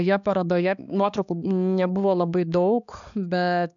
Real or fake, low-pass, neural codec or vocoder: fake; 7.2 kHz; codec, 16 kHz, 2 kbps, X-Codec, HuBERT features, trained on balanced general audio